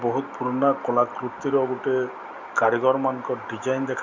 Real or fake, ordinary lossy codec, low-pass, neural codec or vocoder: real; none; 7.2 kHz; none